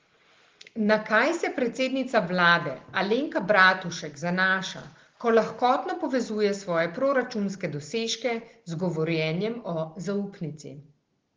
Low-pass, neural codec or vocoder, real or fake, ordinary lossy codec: 7.2 kHz; none; real; Opus, 16 kbps